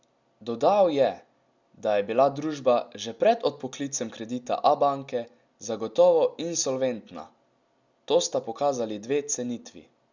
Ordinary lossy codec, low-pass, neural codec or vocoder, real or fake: Opus, 64 kbps; 7.2 kHz; none; real